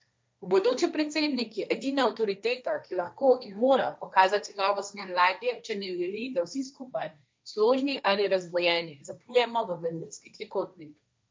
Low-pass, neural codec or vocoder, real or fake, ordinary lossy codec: none; codec, 16 kHz, 1.1 kbps, Voila-Tokenizer; fake; none